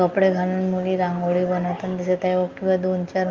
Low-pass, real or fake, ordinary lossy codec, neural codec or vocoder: 7.2 kHz; real; Opus, 24 kbps; none